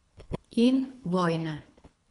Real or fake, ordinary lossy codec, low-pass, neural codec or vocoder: fake; none; 10.8 kHz; codec, 24 kHz, 3 kbps, HILCodec